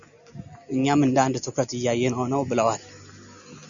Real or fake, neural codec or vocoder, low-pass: real; none; 7.2 kHz